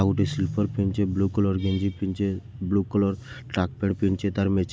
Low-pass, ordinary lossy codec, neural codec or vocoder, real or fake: none; none; none; real